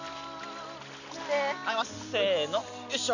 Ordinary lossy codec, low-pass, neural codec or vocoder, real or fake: none; 7.2 kHz; none; real